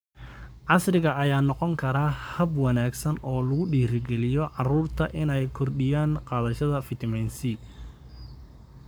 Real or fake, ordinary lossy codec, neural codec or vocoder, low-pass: fake; none; codec, 44.1 kHz, 7.8 kbps, Pupu-Codec; none